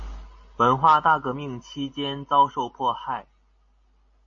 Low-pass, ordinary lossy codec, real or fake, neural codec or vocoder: 7.2 kHz; MP3, 32 kbps; real; none